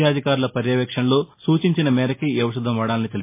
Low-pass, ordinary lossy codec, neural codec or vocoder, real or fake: 3.6 kHz; MP3, 24 kbps; none; real